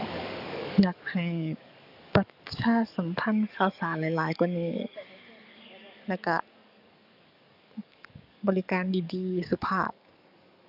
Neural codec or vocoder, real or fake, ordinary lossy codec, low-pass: codec, 44.1 kHz, 7.8 kbps, DAC; fake; none; 5.4 kHz